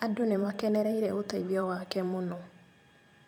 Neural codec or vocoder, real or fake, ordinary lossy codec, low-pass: vocoder, 44.1 kHz, 128 mel bands every 512 samples, BigVGAN v2; fake; none; 19.8 kHz